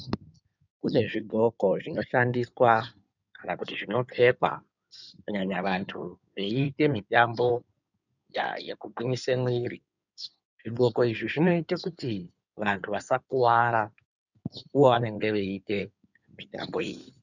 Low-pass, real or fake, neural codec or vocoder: 7.2 kHz; fake; codec, 16 kHz in and 24 kHz out, 2.2 kbps, FireRedTTS-2 codec